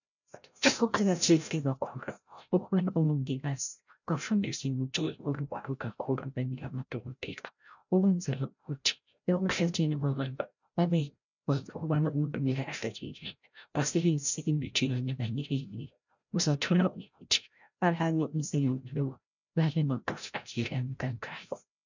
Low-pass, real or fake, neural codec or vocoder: 7.2 kHz; fake; codec, 16 kHz, 0.5 kbps, FreqCodec, larger model